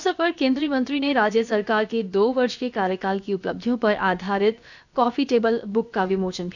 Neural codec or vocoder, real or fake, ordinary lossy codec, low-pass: codec, 16 kHz, about 1 kbps, DyCAST, with the encoder's durations; fake; none; 7.2 kHz